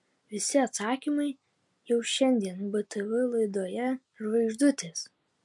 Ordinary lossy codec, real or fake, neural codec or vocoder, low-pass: MP3, 64 kbps; real; none; 10.8 kHz